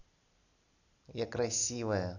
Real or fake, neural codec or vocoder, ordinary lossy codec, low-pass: real; none; none; 7.2 kHz